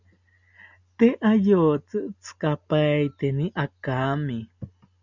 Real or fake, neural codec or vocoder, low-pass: real; none; 7.2 kHz